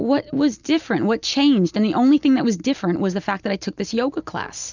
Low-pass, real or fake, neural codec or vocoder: 7.2 kHz; real; none